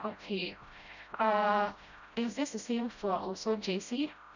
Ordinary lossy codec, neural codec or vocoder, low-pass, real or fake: none; codec, 16 kHz, 0.5 kbps, FreqCodec, smaller model; 7.2 kHz; fake